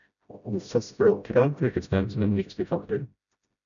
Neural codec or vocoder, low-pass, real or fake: codec, 16 kHz, 0.5 kbps, FreqCodec, smaller model; 7.2 kHz; fake